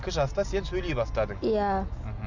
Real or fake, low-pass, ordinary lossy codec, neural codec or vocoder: real; 7.2 kHz; none; none